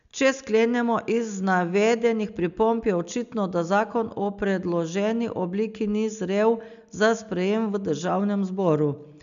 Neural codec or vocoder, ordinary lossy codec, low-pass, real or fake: none; none; 7.2 kHz; real